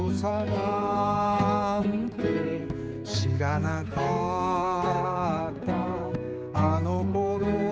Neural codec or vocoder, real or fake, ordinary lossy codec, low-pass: codec, 16 kHz, 4 kbps, X-Codec, HuBERT features, trained on general audio; fake; none; none